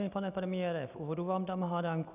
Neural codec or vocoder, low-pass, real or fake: none; 3.6 kHz; real